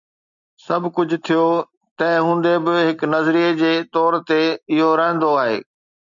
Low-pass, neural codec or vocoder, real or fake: 7.2 kHz; none; real